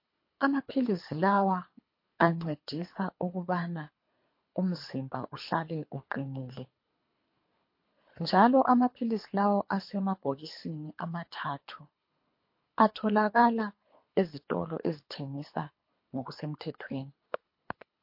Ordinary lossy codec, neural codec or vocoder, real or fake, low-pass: MP3, 32 kbps; codec, 24 kHz, 3 kbps, HILCodec; fake; 5.4 kHz